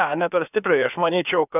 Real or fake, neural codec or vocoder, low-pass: fake; codec, 16 kHz, about 1 kbps, DyCAST, with the encoder's durations; 3.6 kHz